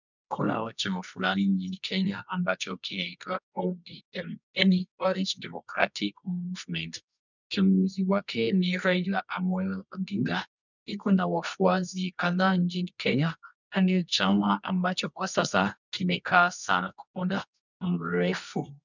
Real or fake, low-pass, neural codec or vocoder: fake; 7.2 kHz; codec, 24 kHz, 0.9 kbps, WavTokenizer, medium music audio release